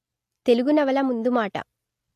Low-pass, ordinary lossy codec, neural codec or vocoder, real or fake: 14.4 kHz; AAC, 64 kbps; none; real